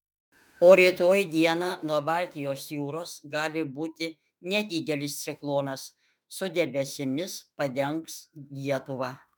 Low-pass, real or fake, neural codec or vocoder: 19.8 kHz; fake; autoencoder, 48 kHz, 32 numbers a frame, DAC-VAE, trained on Japanese speech